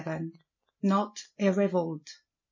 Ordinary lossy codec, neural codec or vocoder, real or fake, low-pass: MP3, 32 kbps; none; real; 7.2 kHz